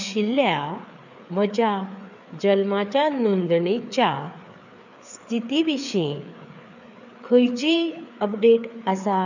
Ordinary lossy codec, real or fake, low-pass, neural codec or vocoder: none; fake; 7.2 kHz; codec, 16 kHz, 4 kbps, FreqCodec, larger model